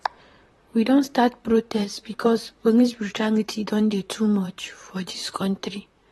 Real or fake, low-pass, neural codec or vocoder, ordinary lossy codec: fake; 19.8 kHz; vocoder, 44.1 kHz, 128 mel bands, Pupu-Vocoder; AAC, 32 kbps